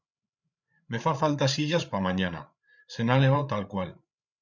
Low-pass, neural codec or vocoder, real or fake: 7.2 kHz; codec, 16 kHz, 8 kbps, FreqCodec, larger model; fake